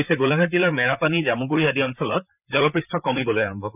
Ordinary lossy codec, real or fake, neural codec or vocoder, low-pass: none; fake; vocoder, 44.1 kHz, 128 mel bands, Pupu-Vocoder; 3.6 kHz